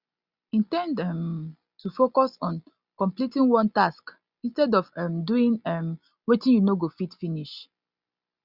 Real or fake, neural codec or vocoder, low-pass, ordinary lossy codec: real; none; 5.4 kHz; Opus, 64 kbps